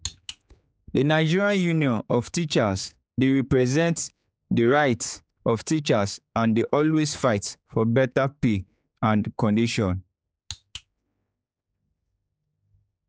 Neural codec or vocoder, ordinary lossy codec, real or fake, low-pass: codec, 16 kHz, 4 kbps, X-Codec, HuBERT features, trained on general audio; none; fake; none